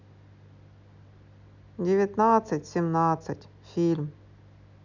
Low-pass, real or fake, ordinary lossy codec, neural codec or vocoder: 7.2 kHz; real; none; none